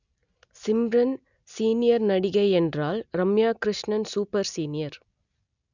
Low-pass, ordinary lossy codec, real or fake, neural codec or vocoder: 7.2 kHz; none; real; none